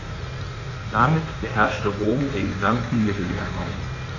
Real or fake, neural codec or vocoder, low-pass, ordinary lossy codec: fake; codec, 16 kHz in and 24 kHz out, 1.1 kbps, FireRedTTS-2 codec; 7.2 kHz; none